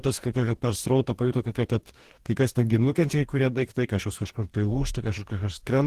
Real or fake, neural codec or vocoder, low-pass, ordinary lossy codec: fake; codec, 44.1 kHz, 2.6 kbps, DAC; 14.4 kHz; Opus, 16 kbps